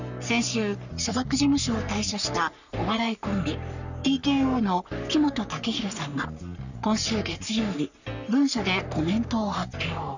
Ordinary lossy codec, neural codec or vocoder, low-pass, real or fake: none; codec, 44.1 kHz, 3.4 kbps, Pupu-Codec; 7.2 kHz; fake